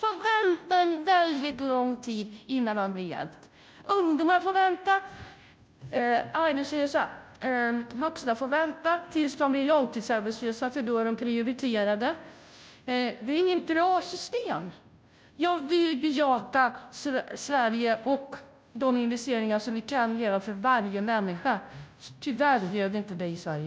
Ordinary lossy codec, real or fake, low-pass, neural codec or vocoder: none; fake; none; codec, 16 kHz, 0.5 kbps, FunCodec, trained on Chinese and English, 25 frames a second